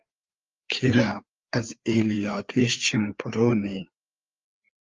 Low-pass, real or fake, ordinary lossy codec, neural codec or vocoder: 7.2 kHz; fake; Opus, 32 kbps; codec, 16 kHz, 4 kbps, FreqCodec, larger model